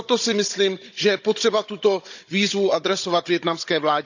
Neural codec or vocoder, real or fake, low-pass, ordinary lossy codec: codec, 16 kHz, 16 kbps, FunCodec, trained on Chinese and English, 50 frames a second; fake; 7.2 kHz; none